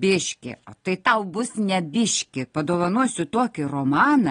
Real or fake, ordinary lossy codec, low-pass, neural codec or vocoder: real; AAC, 32 kbps; 9.9 kHz; none